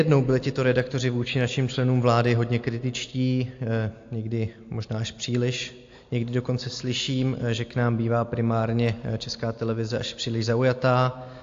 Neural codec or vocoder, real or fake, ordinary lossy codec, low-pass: none; real; AAC, 48 kbps; 7.2 kHz